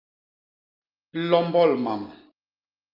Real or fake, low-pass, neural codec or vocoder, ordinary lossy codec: real; 5.4 kHz; none; Opus, 32 kbps